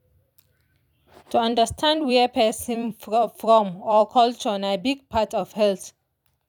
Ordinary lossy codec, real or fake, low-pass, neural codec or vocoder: none; fake; 19.8 kHz; vocoder, 44.1 kHz, 128 mel bands every 256 samples, BigVGAN v2